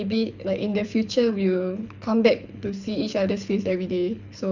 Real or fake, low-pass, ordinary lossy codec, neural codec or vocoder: fake; 7.2 kHz; none; codec, 24 kHz, 6 kbps, HILCodec